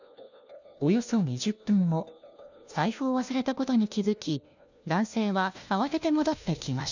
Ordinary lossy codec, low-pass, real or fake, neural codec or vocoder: none; 7.2 kHz; fake; codec, 16 kHz, 1 kbps, FunCodec, trained on LibriTTS, 50 frames a second